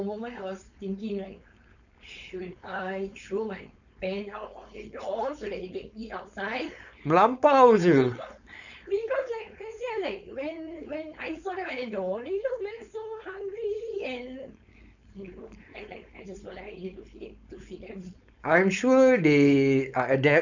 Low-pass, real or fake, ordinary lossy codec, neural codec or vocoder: 7.2 kHz; fake; none; codec, 16 kHz, 4.8 kbps, FACodec